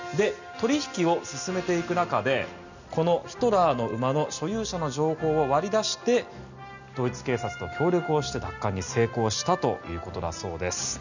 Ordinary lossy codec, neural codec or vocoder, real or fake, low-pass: none; none; real; 7.2 kHz